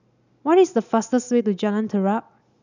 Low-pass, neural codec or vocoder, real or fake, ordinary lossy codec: 7.2 kHz; none; real; none